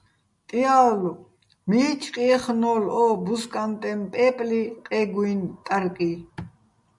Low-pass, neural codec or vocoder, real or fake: 10.8 kHz; none; real